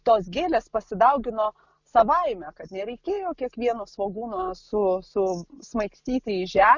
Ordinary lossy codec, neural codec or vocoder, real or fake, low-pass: Opus, 64 kbps; none; real; 7.2 kHz